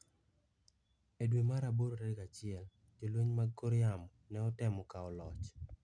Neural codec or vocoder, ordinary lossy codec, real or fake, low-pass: none; none; real; 9.9 kHz